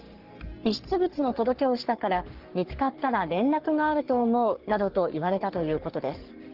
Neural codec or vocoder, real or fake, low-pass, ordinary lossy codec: codec, 44.1 kHz, 3.4 kbps, Pupu-Codec; fake; 5.4 kHz; Opus, 16 kbps